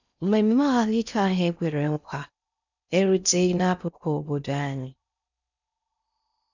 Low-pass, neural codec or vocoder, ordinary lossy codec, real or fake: 7.2 kHz; codec, 16 kHz in and 24 kHz out, 0.6 kbps, FocalCodec, streaming, 4096 codes; none; fake